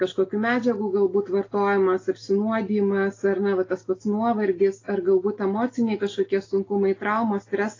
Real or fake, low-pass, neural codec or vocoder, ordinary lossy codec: real; 7.2 kHz; none; AAC, 32 kbps